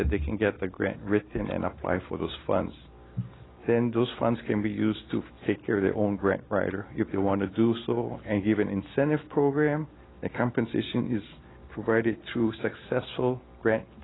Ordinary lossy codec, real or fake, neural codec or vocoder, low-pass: AAC, 16 kbps; real; none; 7.2 kHz